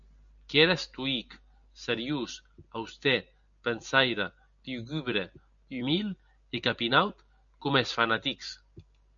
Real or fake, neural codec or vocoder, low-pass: real; none; 7.2 kHz